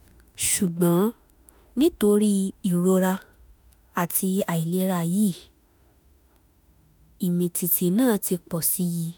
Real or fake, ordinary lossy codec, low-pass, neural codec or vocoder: fake; none; none; autoencoder, 48 kHz, 32 numbers a frame, DAC-VAE, trained on Japanese speech